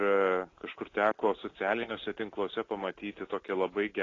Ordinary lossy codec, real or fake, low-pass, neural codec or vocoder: AAC, 32 kbps; real; 7.2 kHz; none